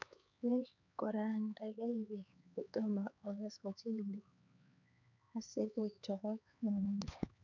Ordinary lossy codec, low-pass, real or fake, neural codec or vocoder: none; 7.2 kHz; fake; codec, 16 kHz, 4 kbps, X-Codec, HuBERT features, trained on LibriSpeech